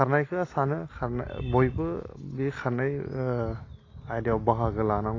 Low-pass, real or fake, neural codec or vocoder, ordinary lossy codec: 7.2 kHz; real; none; AAC, 32 kbps